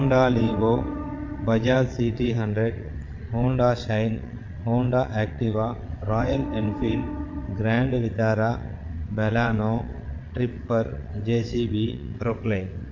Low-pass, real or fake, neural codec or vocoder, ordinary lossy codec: 7.2 kHz; fake; vocoder, 22.05 kHz, 80 mel bands, WaveNeXt; MP3, 48 kbps